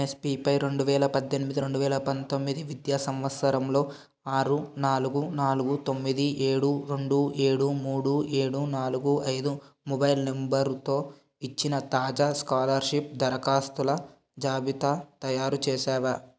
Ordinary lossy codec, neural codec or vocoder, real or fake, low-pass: none; none; real; none